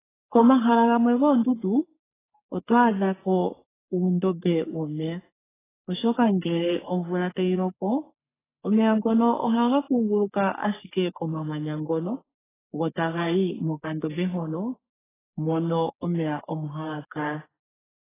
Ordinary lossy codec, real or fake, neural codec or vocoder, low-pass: AAC, 16 kbps; fake; codec, 44.1 kHz, 3.4 kbps, Pupu-Codec; 3.6 kHz